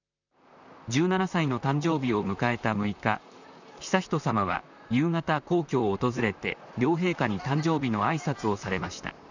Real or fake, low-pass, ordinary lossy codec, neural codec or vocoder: fake; 7.2 kHz; none; vocoder, 44.1 kHz, 128 mel bands, Pupu-Vocoder